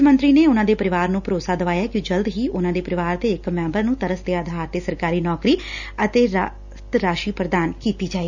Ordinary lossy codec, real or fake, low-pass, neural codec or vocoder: none; real; 7.2 kHz; none